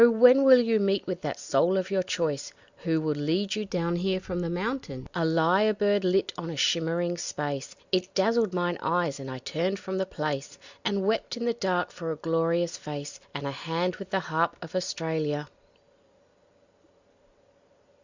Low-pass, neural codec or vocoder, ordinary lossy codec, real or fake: 7.2 kHz; none; Opus, 64 kbps; real